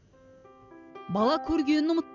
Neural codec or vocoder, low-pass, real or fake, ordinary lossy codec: none; 7.2 kHz; real; none